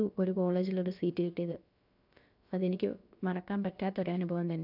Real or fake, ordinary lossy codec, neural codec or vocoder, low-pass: fake; none; codec, 16 kHz, about 1 kbps, DyCAST, with the encoder's durations; 5.4 kHz